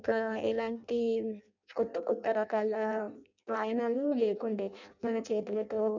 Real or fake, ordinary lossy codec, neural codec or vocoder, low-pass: fake; none; codec, 16 kHz in and 24 kHz out, 0.6 kbps, FireRedTTS-2 codec; 7.2 kHz